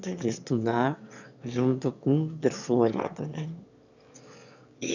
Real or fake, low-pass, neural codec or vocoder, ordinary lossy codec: fake; 7.2 kHz; autoencoder, 22.05 kHz, a latent of 192 numbers a frame, VITS, trained on one speaker; none